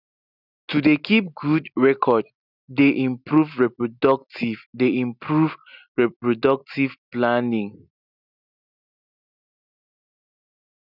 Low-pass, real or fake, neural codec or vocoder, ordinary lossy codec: 5.4 kHz; real; none; none